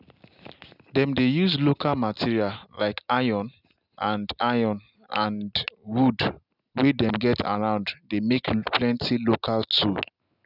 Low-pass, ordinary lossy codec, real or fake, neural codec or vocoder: 5.4 kHz; none; real; none